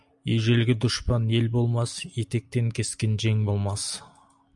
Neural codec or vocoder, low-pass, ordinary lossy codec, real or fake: none; 10.8 kHz; MP3, 96 kbps; real